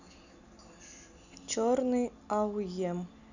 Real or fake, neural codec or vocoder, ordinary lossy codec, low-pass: real; none; none; 7.2 kHz